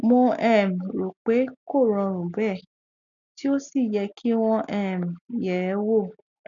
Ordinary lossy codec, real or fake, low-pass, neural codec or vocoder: none; real; 7.2 kHz; none